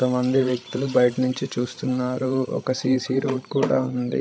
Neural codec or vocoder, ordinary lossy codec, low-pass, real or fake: codec, 16 kHz, 16 kbps, FreqCodec, larger model; none; none; fake